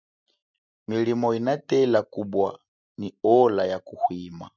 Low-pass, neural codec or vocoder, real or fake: 7.2 kHz; none; real